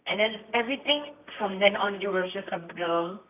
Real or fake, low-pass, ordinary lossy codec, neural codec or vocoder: fake; 3.6 kHz; none; codec, 24 kHz, 0.9 kbps, WavTokenizer, medium music audio release